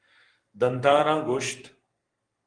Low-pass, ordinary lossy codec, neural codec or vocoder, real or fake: 9.9 kHz; Opus, 32 kbps; codec, 44.1 kHz, 7.8 kbps, Pupu-Codec; fake